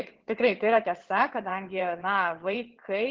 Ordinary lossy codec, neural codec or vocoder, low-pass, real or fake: Opus, 16 kbps; vocoder, 22.05 kHz, 80 mel bands, WaveNeXt; 7.2 kHz; fake